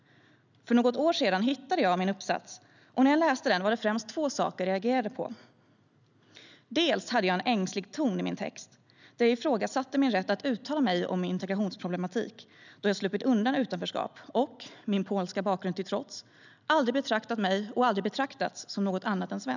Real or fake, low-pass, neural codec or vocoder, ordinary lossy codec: real; 7.2 kHz; none; none